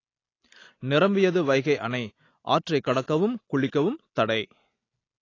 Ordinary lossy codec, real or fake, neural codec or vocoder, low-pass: AAC, 32 kbps; real; none; 7.2 kHz